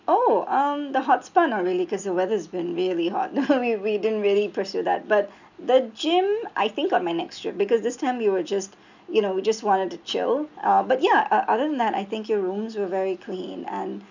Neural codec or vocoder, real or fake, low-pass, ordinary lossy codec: none; real; 7.2 kHz; none